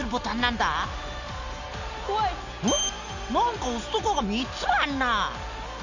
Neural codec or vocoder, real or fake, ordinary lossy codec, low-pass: none; real; Opus, 64 kbps; 7.2 kHz